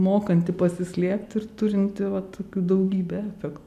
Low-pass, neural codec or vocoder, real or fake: 14.4 kHz; none; real